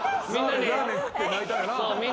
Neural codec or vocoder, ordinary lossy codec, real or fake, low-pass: none; none; real; none